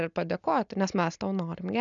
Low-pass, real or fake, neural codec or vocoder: 7.2 kHz; real; none